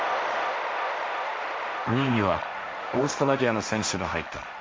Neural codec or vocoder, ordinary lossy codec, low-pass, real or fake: codec, 16 kHz, 1.1 kbps, Voila-Tokenizer; none; none; fake